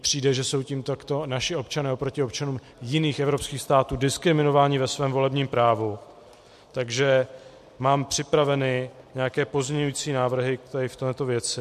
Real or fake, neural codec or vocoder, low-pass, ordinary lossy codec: real; none; 14.4 kHz; MP3, 64 kbps